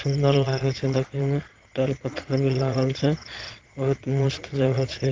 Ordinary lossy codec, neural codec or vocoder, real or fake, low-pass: Opus, 16 kbps; vocoder, 22.05 kHz, 80 mel bands, Vocos; fake; 7.2 kHz